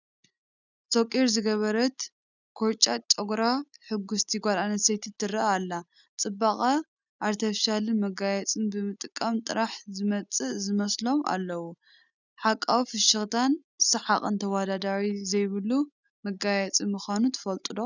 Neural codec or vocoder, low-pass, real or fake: none; 7.2 kHz; real